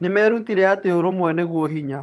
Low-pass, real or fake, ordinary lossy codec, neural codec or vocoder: none; fake; none; vocoder, 22.05 kHz, 80 mel bands, HiFi-GAN